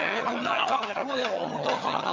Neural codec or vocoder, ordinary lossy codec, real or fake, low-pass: vocoder, 22.05 kHz, 80 mel bands, HiFi-GAN; MP3, 64 kbps; fake; 7.2 kHz